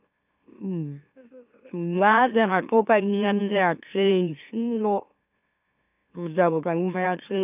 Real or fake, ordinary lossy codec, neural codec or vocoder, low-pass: fake; none; autoencoder, 44.1 kHz, a latent of 192 numbers a frame, MeloTTS; 3.6 kHz